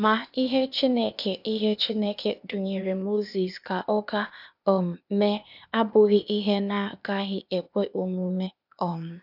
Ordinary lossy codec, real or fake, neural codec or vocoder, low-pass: none; fake; codec, 16 kHz, 0.8 kbps, ZipCodec; 5.4 kHz